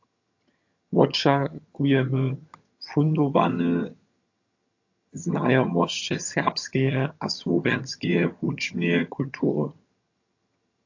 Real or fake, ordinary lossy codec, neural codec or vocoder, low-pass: fake; AAC, 48 kbps; vocoder, 22.05 kHz, 80 mel bands, HiFi-GAN; 7.2 kHz